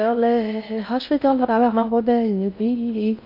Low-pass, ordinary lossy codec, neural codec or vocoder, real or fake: 5.4 kHz; none; codec, 16 kHz in and 24 kHz out, 0.6 kbps, FocalCodec, streaming, 4096 codes; fake